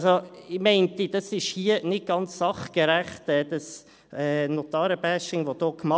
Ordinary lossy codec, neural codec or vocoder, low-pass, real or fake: none; none; none; real